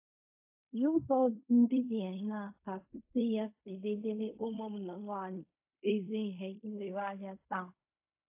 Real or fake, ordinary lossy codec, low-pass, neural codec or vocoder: fake; AAC, 32 kbps; 3.6 kHz; codec, 16 kHz in and 24 kHz out, 0.4 kbps, LongCat-Audio-Codec, fine tuned four codebook decoder